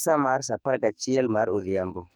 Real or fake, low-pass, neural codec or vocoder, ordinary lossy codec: fake; none; codec, 44.1 kHz, 2.6 kbps, SNAC; none